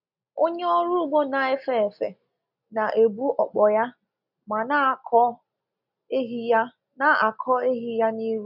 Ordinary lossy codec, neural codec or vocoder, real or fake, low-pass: none; none; real; 5.4 kHz